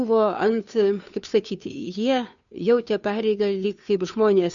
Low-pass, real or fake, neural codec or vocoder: 7.2 kHz; fake; codec, 16 kHz, 2 kbps, FunCodec, trained on Chinese and English, 25 frames a second